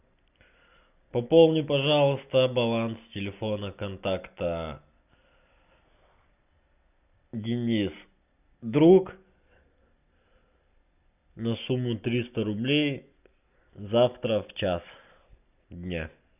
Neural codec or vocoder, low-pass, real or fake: none; 3.6 kHz; real